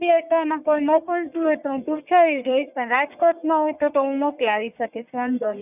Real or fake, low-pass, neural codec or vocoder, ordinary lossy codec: fake; 3.6 kHz; codec, 44.1 kHz, 1.7 kbps, Pupu-Codec; none